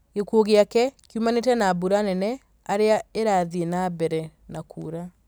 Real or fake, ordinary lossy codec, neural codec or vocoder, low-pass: real; none; none; none